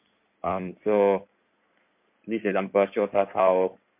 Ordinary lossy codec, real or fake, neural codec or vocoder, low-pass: MP3, 32 kbps; fake; codec, 16 kHz in and 24 kHz out, 2.2 kbps, FireRedTTS-2 codec; 3.6 kHz